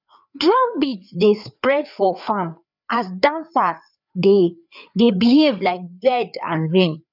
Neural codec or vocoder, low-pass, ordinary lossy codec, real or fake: codec, 16 kHz, 4 kbps, FreqCodec, larger model; 5.4 kHz; none; fake